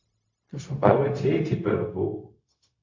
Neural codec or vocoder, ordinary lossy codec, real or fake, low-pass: codec, 16 kHz, 0.4 kbps, LongCat-Audio-Codec; MP3, 48 kbps; fake; 7.2 kHz